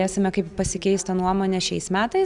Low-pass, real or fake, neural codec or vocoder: 10.8 kHz; real; none